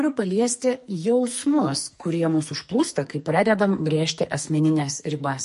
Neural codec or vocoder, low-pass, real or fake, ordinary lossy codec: codec, 44.1 kHz, 2.6 kbps, SNAC; 14.4 kHz; fake; MP3, 48 kbps